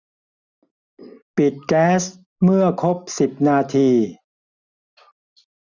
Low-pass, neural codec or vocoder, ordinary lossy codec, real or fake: 7.2 kHz; none; none; real